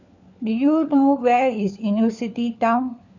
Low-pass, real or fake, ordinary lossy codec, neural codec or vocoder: 7.2 kHz; fake; none; codec, 16 kHz, 4 kbps, FunCodec, trained on LibriTTS, 50 frames a second